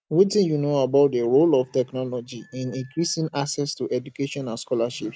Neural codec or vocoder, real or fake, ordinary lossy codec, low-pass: none; real; none; none